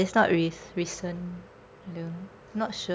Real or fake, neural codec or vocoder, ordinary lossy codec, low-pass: real; none; none; none